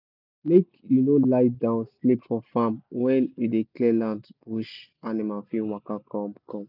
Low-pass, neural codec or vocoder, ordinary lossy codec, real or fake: 5.4 kHz; none; none; real